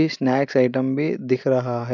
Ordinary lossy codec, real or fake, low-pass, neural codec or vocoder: none; real; 7.2 kHz; none